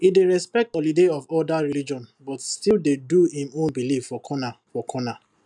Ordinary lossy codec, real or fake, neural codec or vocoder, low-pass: none; real; none; 10.8 kHz